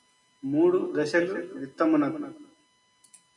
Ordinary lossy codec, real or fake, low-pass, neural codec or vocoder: MP3, 96 kbps; real; 10.8 kHz; none